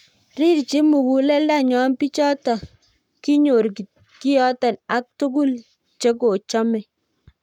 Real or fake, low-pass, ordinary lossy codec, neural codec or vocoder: fake; 19.8 kHz; none; codec, 44.1 kHz, 7.8 kbps, Pupu-Codec